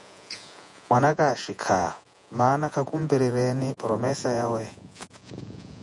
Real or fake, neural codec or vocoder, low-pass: fake; vocoder, 48 kHz, 128 mel bands, Vocos; 10.8 kHz